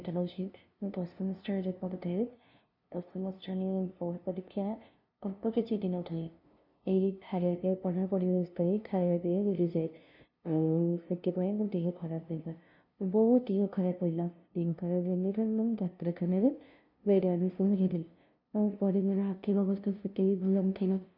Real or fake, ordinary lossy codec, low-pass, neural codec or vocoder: fake; none; 5.4 kHz; codec, 16 kHz, 0.5 kbps, FunCodec, trained on LibriTTS, 25 frames a second